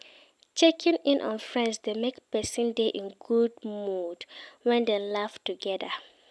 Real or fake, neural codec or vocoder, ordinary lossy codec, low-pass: real; none; none; none